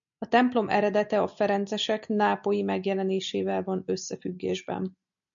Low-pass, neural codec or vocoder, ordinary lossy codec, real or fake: 7.2 kHz; none; MP3, 64 kbps; real